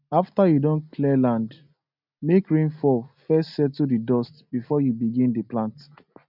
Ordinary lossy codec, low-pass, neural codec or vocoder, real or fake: none; 5.4 kHz; none; real